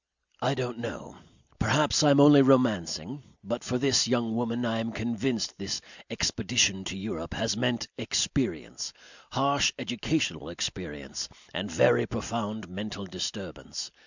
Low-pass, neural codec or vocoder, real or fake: 7.2 kHz; none; real